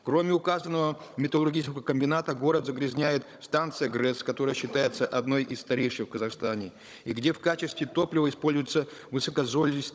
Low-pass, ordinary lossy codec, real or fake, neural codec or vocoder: none; none; fake; codec, 16 kHz, 16 kbps, FunCodec, trained on Chinese and English, 50 frames a second